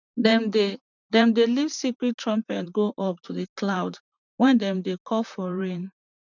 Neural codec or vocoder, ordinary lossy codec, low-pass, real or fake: vocoder, 44.1 kHz, 128 mel bands, Pupu-Vocoder; none; 7.2 kHz; fake